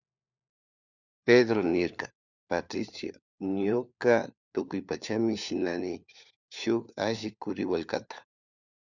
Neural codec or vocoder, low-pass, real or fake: codec, 16 kHz, 4 kbps, FunCodec, trained on LibriTTS, 50 frames a second; 7.2 kHz; fake